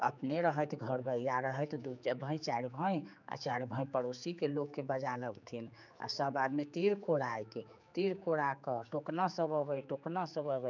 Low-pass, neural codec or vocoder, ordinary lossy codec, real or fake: 7.2 kHz; codec, 16 kHz, 4 kbps, X-Codec, HuBERT features, trained on general audio; none; fake